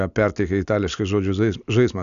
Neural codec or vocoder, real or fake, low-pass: none; real; 7.2 kHz